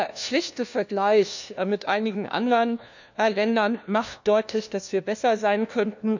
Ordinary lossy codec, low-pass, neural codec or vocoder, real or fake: none; 7.2 kHz; codec, 16 kHz, 1 kbps, FunCodec, trained on LibriTTS, 50 frames a second; fake